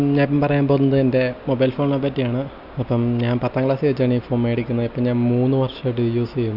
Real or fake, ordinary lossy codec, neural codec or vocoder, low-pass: real; none; none; 5.4 kHz